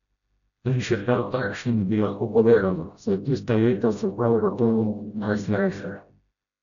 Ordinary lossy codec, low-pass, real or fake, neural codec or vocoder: none; 7.2 kHz; fake; codec, 16 kHz, 0.5 kbps, FreqCodec, smaller model